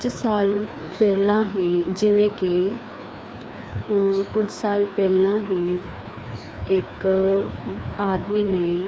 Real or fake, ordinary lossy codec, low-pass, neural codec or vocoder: fake; none; none; codec, 16 kHz, 2 kbps, FreqCodec, larger model